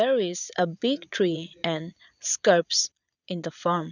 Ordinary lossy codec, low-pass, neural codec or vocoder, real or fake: none; 7.2 kHz; vocoder, 22.05 kHz, 80 mel bands, Vocos; fake